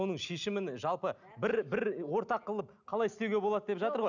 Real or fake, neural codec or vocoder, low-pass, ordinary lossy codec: real; none; 7.2 kHz; none